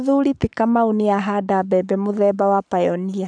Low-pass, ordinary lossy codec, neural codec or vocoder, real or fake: 9.9 kHz; none; codec, 44.1 kHz, 7.8 kbps, Pupu-Codec; fake